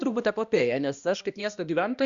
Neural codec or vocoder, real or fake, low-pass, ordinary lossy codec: codec, 16 kHz, 1 kbps, X-Codec, HuBERT features, trained on balanced general audio; fake; 7.2 kHz; Opus, 64 kbps